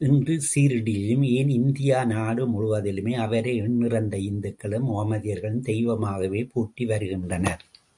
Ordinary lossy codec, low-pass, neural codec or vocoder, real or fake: MP3, 96 kbps; 10.8 kHz; none; real